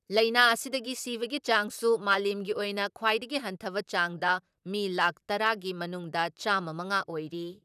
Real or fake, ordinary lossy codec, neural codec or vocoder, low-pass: fake; none; vocoder, 44.1 kHz, 128 mel bands, Pupu-Vocoder; 14.4 kHz